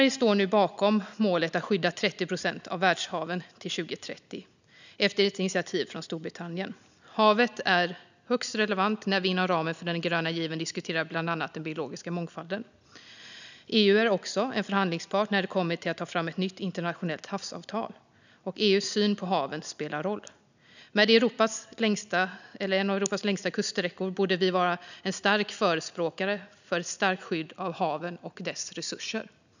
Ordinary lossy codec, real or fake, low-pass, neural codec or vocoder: none; real; 7.2 kHz; none